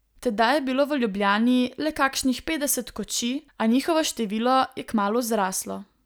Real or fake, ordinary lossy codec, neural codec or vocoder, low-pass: real; none; none; none